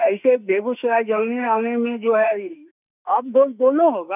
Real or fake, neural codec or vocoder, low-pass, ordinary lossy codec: fake; autoencoder, 48 kHz, 32 numbers a frame, DAC-VAE, trained on Japanese speech; 3.6 kHz; none